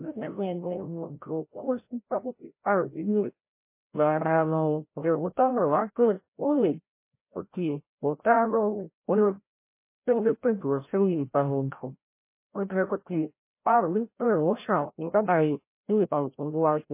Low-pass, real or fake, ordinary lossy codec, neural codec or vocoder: 3.6 kHz; fake; MP3, 24 kbps; codec, 16 kHz, 0.5 kbps, FreqCodec, larger model